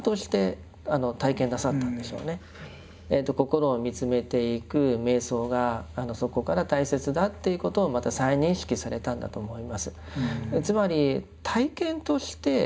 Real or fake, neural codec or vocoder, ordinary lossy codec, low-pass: real; none; none; none